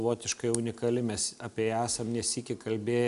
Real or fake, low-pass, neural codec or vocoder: real; 10.8 kHz; none